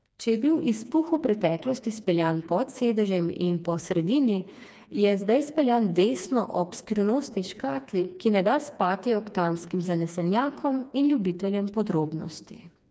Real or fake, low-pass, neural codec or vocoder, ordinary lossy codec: fake; none; codec, 16 kHz, 2 kbps, FreqCodec, smaller model; none